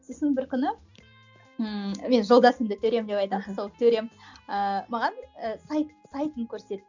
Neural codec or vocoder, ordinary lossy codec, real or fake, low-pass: none; none; real; 7.2 kHz